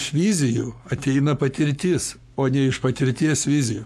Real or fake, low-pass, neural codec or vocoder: fake; 14.4 kHz; codec, 44.1 kHz, 7.8 kbps, Pupu-Codec